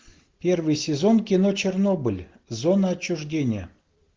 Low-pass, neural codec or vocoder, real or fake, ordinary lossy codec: 7.2 kHz; none; real; Opus, 16 kbps